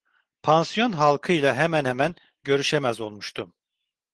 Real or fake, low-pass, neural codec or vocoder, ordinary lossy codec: real; 10.8 kHz; none; Opus, 16 kbps